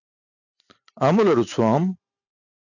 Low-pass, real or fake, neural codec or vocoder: 7.2 kHz; real; none